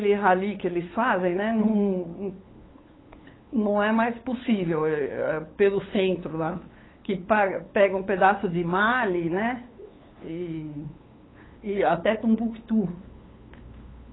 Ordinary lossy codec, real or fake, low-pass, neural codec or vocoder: AAC, 16 kbps; fake; 7.2 kHz; codec, 16 kHz, 8 kbps, FunCodec, trained on LibriTTS, 25 frames a second